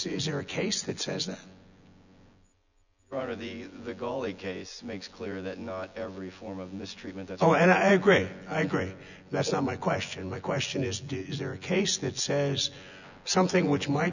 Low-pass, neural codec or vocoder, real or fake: 7.2 kHz; vocoder, 24 kHz, 100 mel bands, Vocos; fake